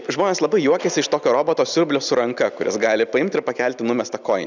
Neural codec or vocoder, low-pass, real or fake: none; 7.2 kHz; real